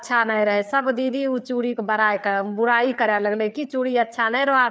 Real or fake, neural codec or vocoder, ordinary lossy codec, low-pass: fake; codec, 16 kHz, 4 kbps, FreqCodec, larger model; none; none